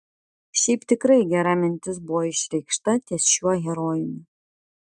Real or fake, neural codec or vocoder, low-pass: real; none; 10.8 kHz